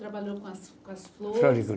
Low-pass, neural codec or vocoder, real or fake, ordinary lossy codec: none; none; real; none